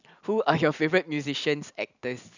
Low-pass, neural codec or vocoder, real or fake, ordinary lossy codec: 7.2 kHz; none; real; none